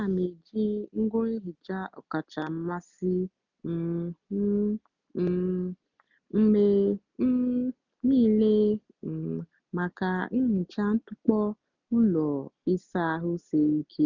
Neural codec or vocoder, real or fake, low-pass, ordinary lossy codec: none; real; 7.2 kHz; none